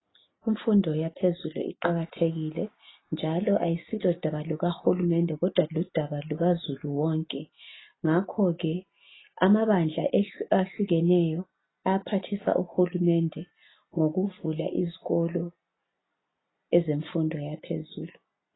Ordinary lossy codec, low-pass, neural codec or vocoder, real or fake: AAC, 16 kbps; 7.2 kHz; none; real